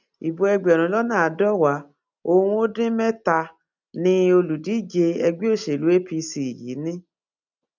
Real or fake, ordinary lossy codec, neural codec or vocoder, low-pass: real; none; none; 7.2 kHz